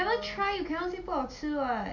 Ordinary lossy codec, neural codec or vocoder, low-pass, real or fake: none; none; 7.2 kHz; real